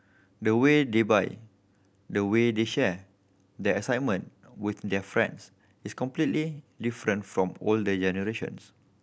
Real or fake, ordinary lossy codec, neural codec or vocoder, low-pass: real; none; none; none